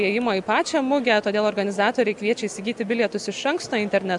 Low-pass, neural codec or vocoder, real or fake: 10.8 kHz; none; real